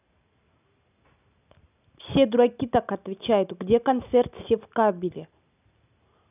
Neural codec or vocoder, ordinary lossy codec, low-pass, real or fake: none; none; 3.6 kHz; real